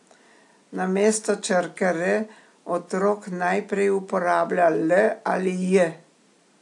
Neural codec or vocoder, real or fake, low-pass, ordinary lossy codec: none; real; 10.8 kHz; AAC, 64 kbps